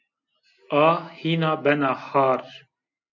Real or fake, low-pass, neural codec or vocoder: real; 7.2 kHz; none